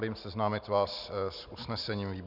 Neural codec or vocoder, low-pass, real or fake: none; 5.4 kHz; real